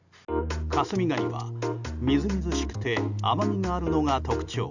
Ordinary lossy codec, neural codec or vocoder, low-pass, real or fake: none; none; 7.2 kHz; real